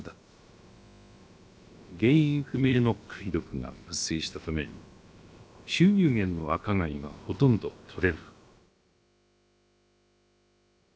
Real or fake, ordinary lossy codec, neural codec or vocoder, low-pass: fake; none; codec, 16 kHz, about 1 kbps, DyCAST, with the encoder's durations; none